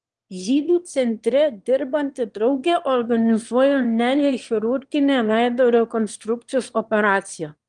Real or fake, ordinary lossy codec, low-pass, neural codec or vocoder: fake; Opus, 24 kbps; 9.9 kHz; autoencoder, 22.05 kHz, a latent of 192 numbers a frame, VITS, trained on one speaker